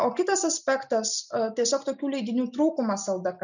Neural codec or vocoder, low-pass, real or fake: none; 7.2 kHz; real